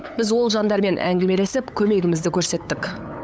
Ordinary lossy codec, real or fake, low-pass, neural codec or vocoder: none; fake; none; codec, 16 kHz, 8 kbps, FunCodec, trained on LibriTTS, 25 frames a second